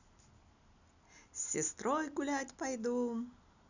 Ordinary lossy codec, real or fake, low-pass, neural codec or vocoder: none; real; 7.2 kHz; none